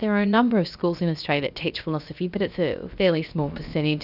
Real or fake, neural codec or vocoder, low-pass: fake; codec, 16 kHz, about 1 kbps, DyCAST, with the encoder's durations; 5.4 kHz